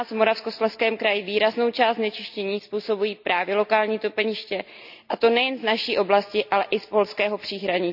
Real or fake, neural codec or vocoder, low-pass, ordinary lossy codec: real; none; 5.4 kHz; none